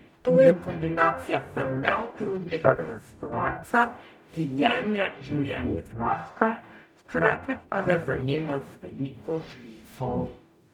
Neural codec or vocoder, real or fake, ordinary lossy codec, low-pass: codec, 44.1 kHz, 0.9 kbps, DAC; fake; none; 19.8 kHz